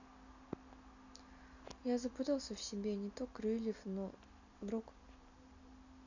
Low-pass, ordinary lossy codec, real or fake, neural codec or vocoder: 7.2 kHz; none; real; none